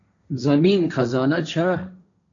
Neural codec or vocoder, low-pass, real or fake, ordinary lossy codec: codec, 16 kHz, 1.1 kbps, Voila-Tokenizer; 7.2 kHz; fake; MP3, 48 kbps